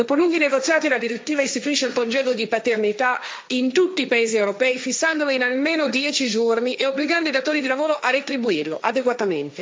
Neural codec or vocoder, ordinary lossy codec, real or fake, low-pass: codec, 16 kHz, 1.1 kbps, Voila-Tokenizer; none; fake; none